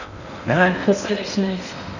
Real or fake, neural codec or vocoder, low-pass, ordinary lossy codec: fake; codec, 16 kHz in and 24 kHz out, 0.6 kbps, FocalCodec, streaming, 4096 codes; 7.2 kHz; none